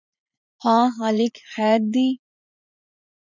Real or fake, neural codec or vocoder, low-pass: fake; vocoder, 44.1 kHz, 80 mel bands, Vocos; 7.2 kHz